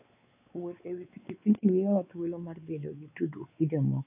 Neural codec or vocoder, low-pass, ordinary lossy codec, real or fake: codec, 16 kHz, 4 kbps, X-Codec, WavLM features, trained on Multilingual LibriSpeech; 3.6 kHz; AAC, 24 kbps; fake